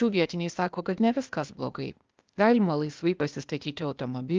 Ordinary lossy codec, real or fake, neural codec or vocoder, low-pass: Opus, 24 kbps; fake; codec, 16 kHz, 0.8 kbps, ZipCodec; 7.2 kHz